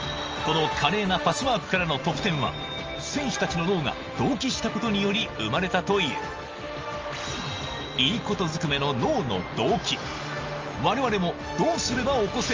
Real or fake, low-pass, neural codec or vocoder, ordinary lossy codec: real; 7.2 kHz; none; Opus, 24 kbps